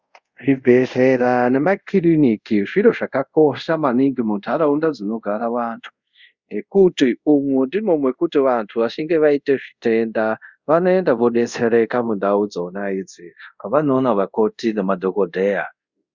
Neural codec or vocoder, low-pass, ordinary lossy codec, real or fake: codec, 24 kHz, 0.5 kbps, DualCodec; 7.2 kHz; Opus, 64 kbps; fake